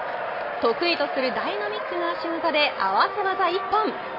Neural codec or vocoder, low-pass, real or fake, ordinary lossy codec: none; 5.4 kHz; real; MP3, 24 kbps